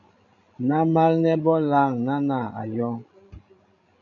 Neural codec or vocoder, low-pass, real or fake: codec, 16 kHz, 16 kbps, FreqCodec, larger model; 7.2 kHz; fake